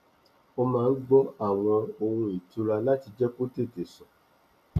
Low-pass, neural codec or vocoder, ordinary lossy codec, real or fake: 14.4 kHz; none; none; real